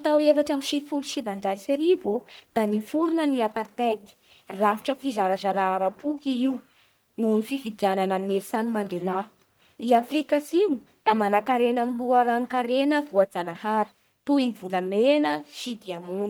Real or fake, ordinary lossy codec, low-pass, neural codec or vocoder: fake; none; none; codec, 44.1 kHz, 1.7 kbps, Pupu-Codec